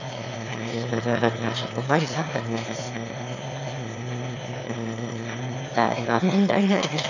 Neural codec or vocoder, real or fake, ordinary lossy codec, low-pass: autoencoder, 22.05 kHz, a latent of 192 numbers a frame, VITS, trained on one speaker; fake; none; 7.2 kHz